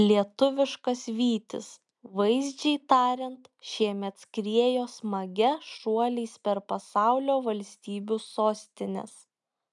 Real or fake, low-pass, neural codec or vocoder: real; 10.8 kHz; none